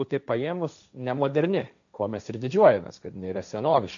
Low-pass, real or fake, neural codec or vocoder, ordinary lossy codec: 7.2 kHz; fake; codec, 16 kHz, 1.1 kbps, Voila-Tokenizer; MP3, 96 kbps